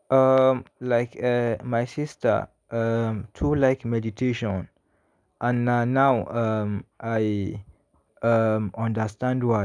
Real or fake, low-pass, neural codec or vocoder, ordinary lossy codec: real; 9.9 kHz; none; none